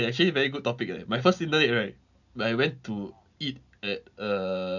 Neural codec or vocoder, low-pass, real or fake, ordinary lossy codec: none; 7.2 kHz; real; none